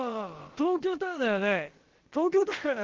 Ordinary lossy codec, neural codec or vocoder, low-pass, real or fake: Opus, 16 kbps; codec, 16 kHz, about 1 kbps, DyCAST, with the encoder's durations; 7.2 kHz; fake